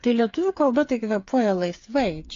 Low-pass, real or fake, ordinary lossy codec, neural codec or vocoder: 7.2 kHz; fake; AAC, 48 kbps; codec, 16 kHz, 8 kbps, FreqCodec, smaller model